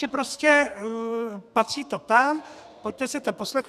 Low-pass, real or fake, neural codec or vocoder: 14.4 kHz; fake; codec, 32 kHz, 1.9 kbps, SNAC